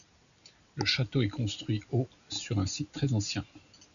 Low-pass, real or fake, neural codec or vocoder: 7.2 kHz; real; none